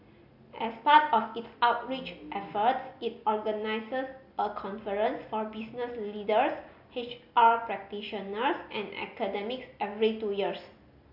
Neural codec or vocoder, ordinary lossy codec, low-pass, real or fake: none; AAC, 48 kbps; 5.4 kHz; real